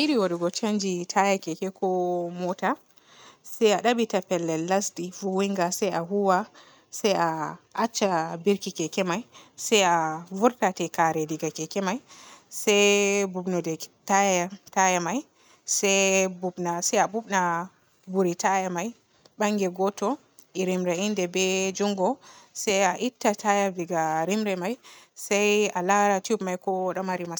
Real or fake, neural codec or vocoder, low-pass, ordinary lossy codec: real; none; none; none